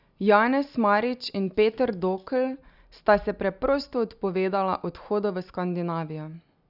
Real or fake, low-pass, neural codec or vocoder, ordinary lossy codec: real; 5.4 kHz; none; none